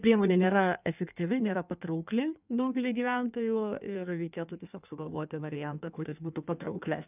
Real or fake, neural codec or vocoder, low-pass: fake; codec, 16 kHz in and 24 kHz out, 1.1 kbps, FireRedTTS-2 codec; 3.6 kHz